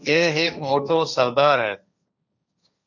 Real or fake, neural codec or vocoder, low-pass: fake; codec, 16 kHz, 1.1 kbps, Voila-Tokenizer; 7.2 kHz